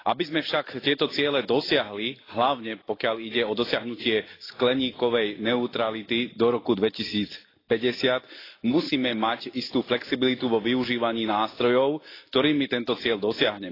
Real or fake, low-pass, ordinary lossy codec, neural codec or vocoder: real; 5.4 kHz; AAC, 24 kbps; none